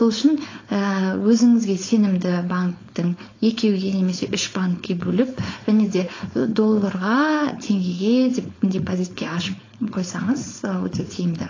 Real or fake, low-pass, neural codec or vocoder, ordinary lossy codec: fake; 7.2 kHz; codec, 16 kHz, 4.8 kbps, FACodec; AAC, 32 kbps